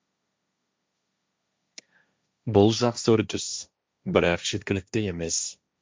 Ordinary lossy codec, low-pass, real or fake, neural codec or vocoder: none; none; fake; codec, 16 kHz, 1.1 kbps, Voila-Tokenizer